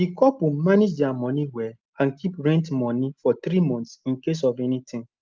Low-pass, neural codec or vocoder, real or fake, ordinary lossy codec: 7.2 kHz; none; real; Opus, 32 kbps